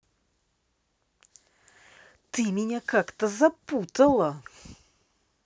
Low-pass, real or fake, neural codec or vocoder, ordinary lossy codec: none; real; none; none